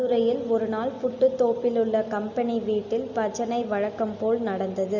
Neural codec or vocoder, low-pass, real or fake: none; 7.2 kHz; real